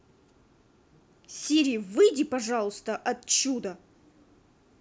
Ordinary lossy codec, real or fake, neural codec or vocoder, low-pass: none; real; none; none